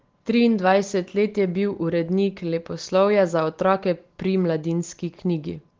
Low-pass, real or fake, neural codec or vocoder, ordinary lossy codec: 7.2 kHz; real; none; Opus, 16 kbps